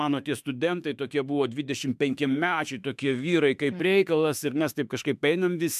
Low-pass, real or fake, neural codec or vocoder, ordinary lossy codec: 14.4 kHz; fake; autoencoder, 48 kHz, 32 numbers a frame, DAC-VAE, trained on Japanese speech; MP3, 96 kbps